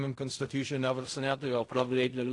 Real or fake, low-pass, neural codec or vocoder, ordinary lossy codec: fake; 10.8 kHz; codec, 16 kHz in and 24 kHz out, 0.4 kbps, LongCat-Audio-Codec, fine tuned four codebook decoder; AAC, 48 kbps